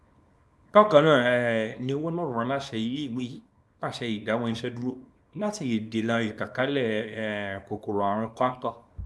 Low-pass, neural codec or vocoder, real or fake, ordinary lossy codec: none; codec, 24 kHz, 0.9 kbps, WavTokenizer, small release; fake; none